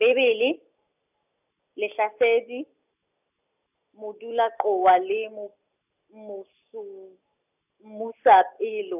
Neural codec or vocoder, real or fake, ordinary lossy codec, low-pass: none; real; none; 3.6 kHz